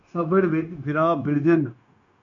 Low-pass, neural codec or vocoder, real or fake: 7.2 kHz; codec, 16 kHz, 0.9 kbps, LongCat-Audio-Codec; fake